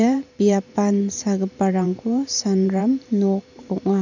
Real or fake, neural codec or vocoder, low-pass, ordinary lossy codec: fake; vocoder, 44.1 kHz, 128 mel bands every 512 samples, BigVGAN v2; 7.2 kHz; none